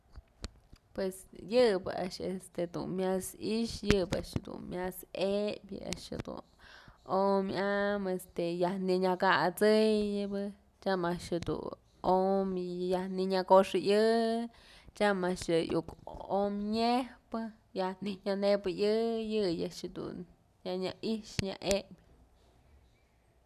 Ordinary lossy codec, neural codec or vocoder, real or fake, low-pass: none; none; real; 14.4 kHz